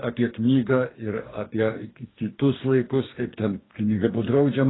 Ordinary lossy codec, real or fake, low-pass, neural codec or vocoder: AAC, 16 kbps; fake; 7.2 kHz; codec, 44.1 kHz, 2.6 kbps, DAC